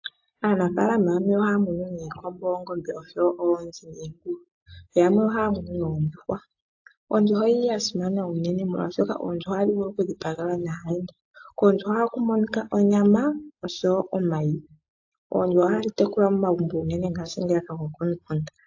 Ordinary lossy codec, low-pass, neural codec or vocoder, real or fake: AAC, 48 kbps; 7.2 kHz; none; real